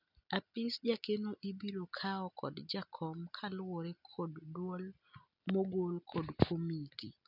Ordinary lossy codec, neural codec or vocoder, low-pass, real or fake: none; none; 5.4 kHz; real